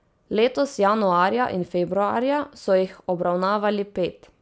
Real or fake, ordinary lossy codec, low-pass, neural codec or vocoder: real; none; none; none